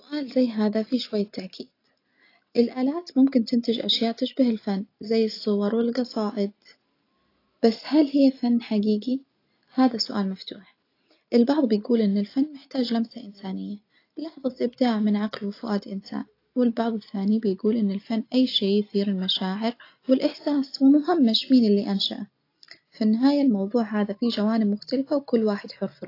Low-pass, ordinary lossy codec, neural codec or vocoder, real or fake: 5.4 kHz; AAC, 32 kbps; none; real